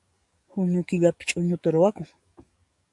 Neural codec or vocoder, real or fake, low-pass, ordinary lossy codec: codec, 44.1 kHz, 7.8 kbps, DAC; fake; 10.8 kHz; MP3, 96 kbps